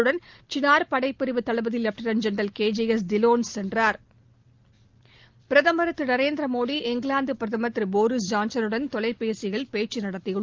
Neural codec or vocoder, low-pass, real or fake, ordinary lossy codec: none; 7.2 kHz; real; Opus, 32 kbps